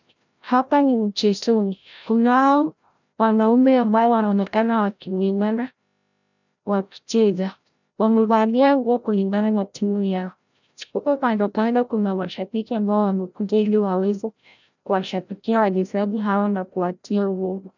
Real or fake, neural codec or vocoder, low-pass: fake; codec, 16 kHz, 0.5 kbps, FreqCodec, larger model; 7.2 kHz